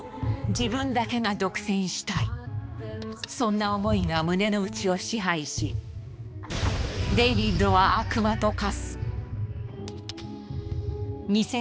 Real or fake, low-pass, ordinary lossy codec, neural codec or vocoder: fake; none; none; codec, 16 kHz, 2 kbps, X-Codec, HuBERT features, trained on balanced general audio